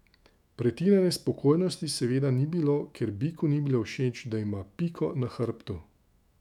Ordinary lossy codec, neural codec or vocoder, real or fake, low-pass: none; autoencoder, 48 kHz, 128 numbers a frame, DAC-VAE, trained on Japanese speech; fake; 19.8 kHz